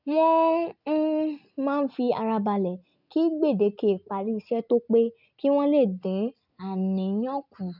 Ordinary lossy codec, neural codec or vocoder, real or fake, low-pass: none; none; real; 5.4 kHz